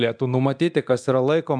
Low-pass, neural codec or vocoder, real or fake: 9.9 kHz; codec, 24 kHz, 0.9 kbps, DualCodec; fake